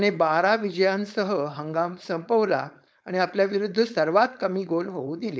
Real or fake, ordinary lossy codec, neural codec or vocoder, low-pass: fake; none; codec, 16 kHz, 4.8 kbps, FACodec; none